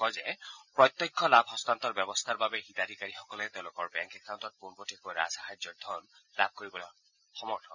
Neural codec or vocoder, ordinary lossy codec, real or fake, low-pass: none; none; real; 7.2 kHz